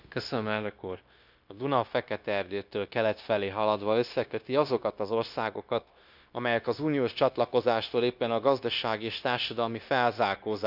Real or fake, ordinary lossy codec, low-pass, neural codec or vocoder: fake; none; 5.4 kHz; codec, 16 kHz, 0.9 kbps, LongCat-Audio-Codec